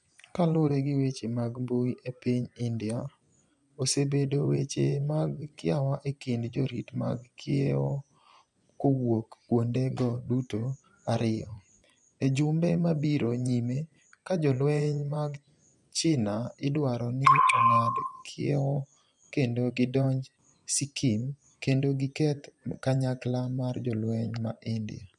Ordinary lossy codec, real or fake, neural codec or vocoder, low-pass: none; fake; vocoder, 24 kHz, 100 mel bands, Vocos; 10.8 kHz